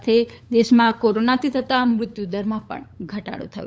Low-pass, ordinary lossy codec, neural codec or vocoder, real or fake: none; none; codec, 16 kHz, 8 kbps, FunCodec, trained on LibriTTS, 25 frames a second; fake